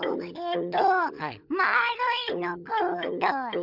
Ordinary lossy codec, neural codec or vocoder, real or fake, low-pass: none; codec, 16 kHz, 16 kbps, FunCodec, trained on LibriTTS, 50 frames a second; fake; 5.4 kHz